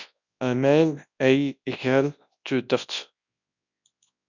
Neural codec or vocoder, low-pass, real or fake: codec, 24 kHz, 0.9 kbps, WavTokenizer, large speech release; 7.2 kHz; fake